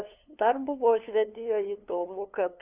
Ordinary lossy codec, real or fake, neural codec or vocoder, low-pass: Opus, 64 kbps; fake; codec, 16 kHz, 2 kbps, FunCodec, trained on LibriTTS, 25 frames a second; 3.6 kHz